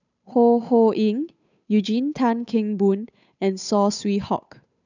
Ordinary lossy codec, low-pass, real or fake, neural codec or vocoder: none; 7.2 kHz; fake; codec, 16 kHz, 8 kbps, FunCodec, trained on Chinese and English, 25 frames a second